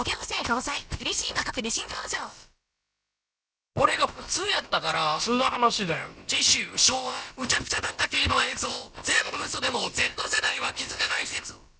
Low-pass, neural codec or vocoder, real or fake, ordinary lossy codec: none; codec, 16 kHz, about 1 kbps, DyCAST, with the encoder's durations; fake; none